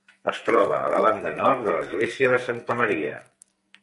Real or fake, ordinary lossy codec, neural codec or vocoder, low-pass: fake; MP3, 48 kbps; codec, 44.1 kHz, 2.6 kbps, SNAC; 14.4 kHz